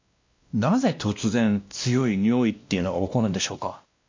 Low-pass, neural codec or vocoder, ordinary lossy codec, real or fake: 7.2 kHz; codec, 16 kHz, 1 kbps, X-Codec, WavLM features, trained on Multilingual LibriSpeech; MP3, 64 kbps; fake